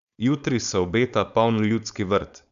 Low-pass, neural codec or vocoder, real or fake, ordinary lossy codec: 7.2 kHz; codec, 16 kHz, 4.8 kbps, FACodec; fake; none